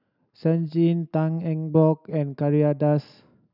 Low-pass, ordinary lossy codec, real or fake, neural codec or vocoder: 5.4 kHz; none; real; none